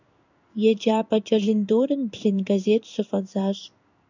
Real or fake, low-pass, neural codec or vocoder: fake; 7.2 kHz; codec, 16 kHz in and 24 kHz out, 1 kbps, XY-Tokenizer